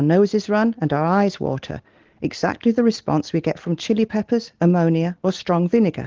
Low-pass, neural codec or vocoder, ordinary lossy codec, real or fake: 7.2 kHz; none; Opus, 16 kbps; real